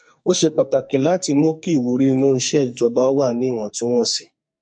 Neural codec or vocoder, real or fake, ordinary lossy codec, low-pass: codec, 44.1 kHz, 2.6 kbps, SNAC; fake; MP3, 48 kbps; 9.9 kHz